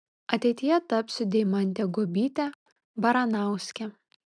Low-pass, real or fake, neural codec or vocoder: 9.9 kHz; real; none